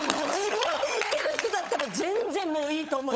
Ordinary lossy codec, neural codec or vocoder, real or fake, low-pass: none; codec, 16 kHz, 16 kbps, FunCodec, trained on LibriTTS, 50 frames a second; fake; none